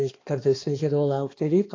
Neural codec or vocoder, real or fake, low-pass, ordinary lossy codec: codec, 16 kHz, 2 kbps, X-Codec, HuBERT features, trained on balanced general audio; fake; 7.2 kHz; AAC, 32 kbps